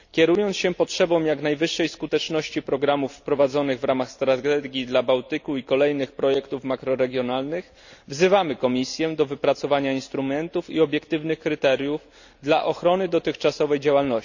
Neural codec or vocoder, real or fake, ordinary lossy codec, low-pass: none; real; none; 7.2 kHz